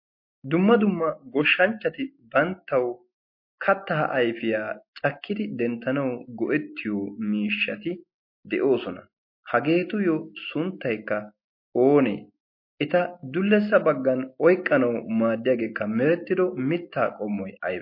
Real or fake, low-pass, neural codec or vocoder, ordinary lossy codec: real; 5.4 kHz; none; MP3, 32 kbps